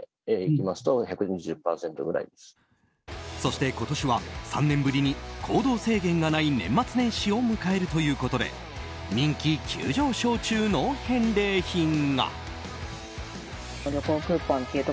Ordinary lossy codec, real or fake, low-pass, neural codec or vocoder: none; real; none; none